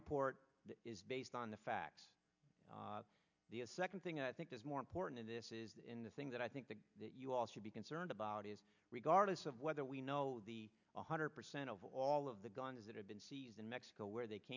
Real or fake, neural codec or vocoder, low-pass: real; none; 7.2 kHz